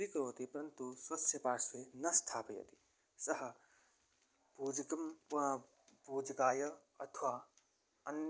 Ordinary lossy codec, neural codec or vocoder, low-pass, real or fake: none; none; none; real